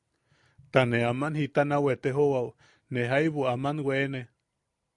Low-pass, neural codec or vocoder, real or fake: 10.8 kHz; none; real